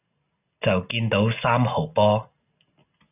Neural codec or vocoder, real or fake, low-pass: none; real; 3.6 kHz